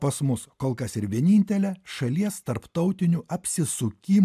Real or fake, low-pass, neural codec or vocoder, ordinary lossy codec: real; 14.4 kHz; none; MP3, 96 kbps